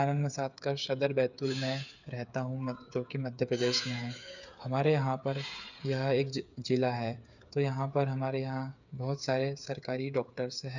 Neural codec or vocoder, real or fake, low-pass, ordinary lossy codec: codec, 16 kHz, 8 kbps, FreqCodec, smaller model; fake; 7.2 kHz; none